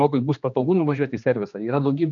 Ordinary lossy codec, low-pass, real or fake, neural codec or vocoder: MP3, 96 kbps; 7.2 kHz; fake; codec, 16 kHz, 2 kbps, X-Codec, HuBERT features, trained on general audio